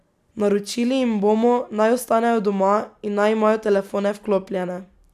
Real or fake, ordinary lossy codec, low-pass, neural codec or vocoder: real; none; 14.4 kHz; none